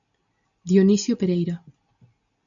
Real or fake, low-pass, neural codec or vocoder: real; 7.2 kHz; none